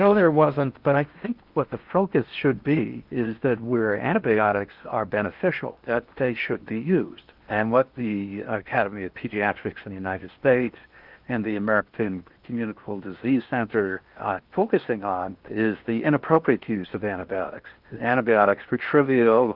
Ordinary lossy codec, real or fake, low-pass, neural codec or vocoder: Opus, 24 kbps; fake; 5.4 kHz; codec, 16 kHz in and 24 kHz out, 0.8 kbps, FocalCodec, streaming, 65536 codes